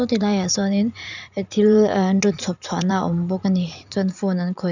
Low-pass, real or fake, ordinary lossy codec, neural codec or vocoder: 7.2 kHz; fake; none; vocoder, 44.1 kHz, 80 mel bands, Vocos